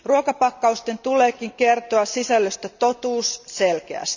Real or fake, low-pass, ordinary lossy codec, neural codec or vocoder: real; 7.2 kHz; none; none